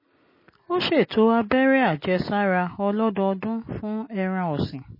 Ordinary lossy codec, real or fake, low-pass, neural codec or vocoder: MP3, 24 kbps; real; 5.4 kHz; none